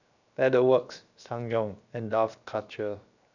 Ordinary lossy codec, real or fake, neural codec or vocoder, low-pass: none; fake; codec, 16 kHz, 0.7 kbps, FocalCodec; 7.2 kHz